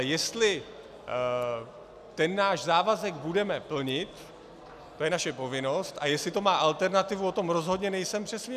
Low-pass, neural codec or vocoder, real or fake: 14.4 kHz; none; real